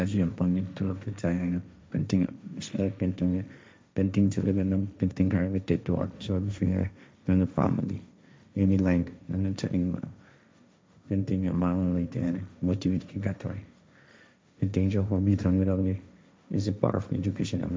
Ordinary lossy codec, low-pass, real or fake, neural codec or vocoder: none; none; fake; codec, 16 kHz, 1.1 kbps, Voila-Tokenizer